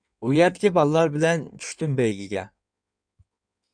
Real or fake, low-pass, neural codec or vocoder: fake; 9.9 kHz; codec, 16 kHz in and 24 kHz out, 1.1 kbps, FireRedTTS-2 codec